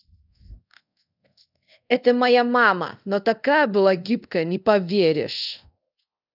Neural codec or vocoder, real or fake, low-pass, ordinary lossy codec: codec, 24 kHz, 0.5 kbps, DualCodec; fake; 5.4 kHz; none